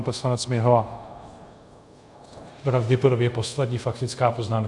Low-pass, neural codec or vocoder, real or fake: 10.8 kHz; codec, 24 kHz, 0.5 kbps, DualCodec; fake